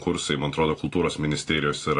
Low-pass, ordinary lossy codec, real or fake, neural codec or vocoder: 10.8 kHz; AAC, 48 kbps; real; none